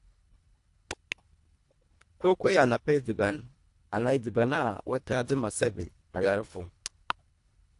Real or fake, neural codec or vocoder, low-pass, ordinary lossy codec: fake; codec, 24 kHz, 1.5 kbps, HILCodec; 10.8 kHz; AAC, 64 kbps